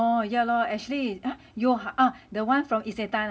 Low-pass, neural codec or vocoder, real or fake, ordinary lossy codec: none; none; real; none